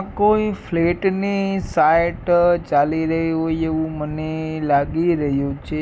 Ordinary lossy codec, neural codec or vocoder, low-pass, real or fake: none; none; none; real